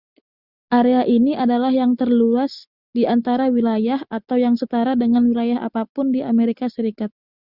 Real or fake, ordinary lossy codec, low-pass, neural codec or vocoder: real; Opus, 64 kbps; 5.4 kHz; none